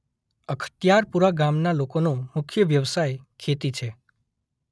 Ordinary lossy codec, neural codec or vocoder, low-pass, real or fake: none; none; none; real